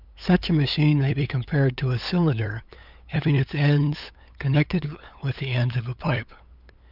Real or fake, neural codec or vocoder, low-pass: fake; codec, 16 kHz, 8 kbps, FunCodec, trained on LibriTTS, 25 frames a second; 5.4 kHz